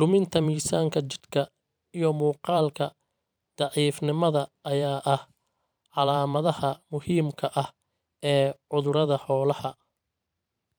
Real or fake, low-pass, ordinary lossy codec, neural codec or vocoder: fake; none; none; vocoder, 44.1 kHz, 128 mel bands every 512 samples, BigVGAN v2